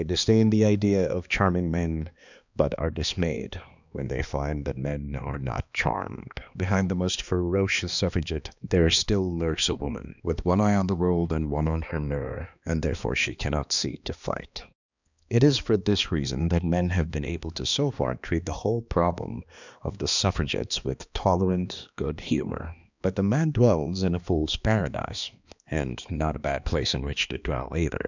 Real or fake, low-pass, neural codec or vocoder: fake; 7.2 kHz; codec, 16 kHz, 2 kbps, X-Codec, HuBERT features, trained on balanced general audio